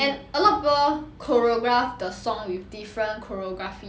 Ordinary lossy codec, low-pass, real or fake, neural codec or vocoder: none; none; real; none